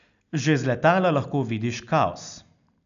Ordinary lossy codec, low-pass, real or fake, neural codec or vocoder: none; 7.2 kHz; real; none